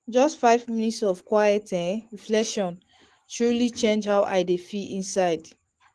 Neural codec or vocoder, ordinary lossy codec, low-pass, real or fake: autoencoder, 48 kHz, 128 numbers a frame, DAC-VAE, trained on Japanese speech; Opus, 16 kbps; 10.8 kHz; fake